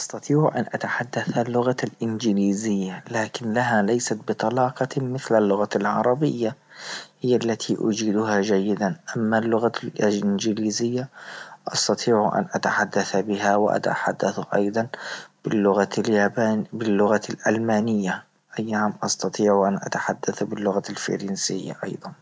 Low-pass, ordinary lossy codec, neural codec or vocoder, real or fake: none; none; none; real